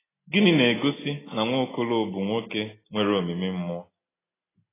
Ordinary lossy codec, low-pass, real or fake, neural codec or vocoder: AAC, 16 kbps; 3.6 kHz; real; none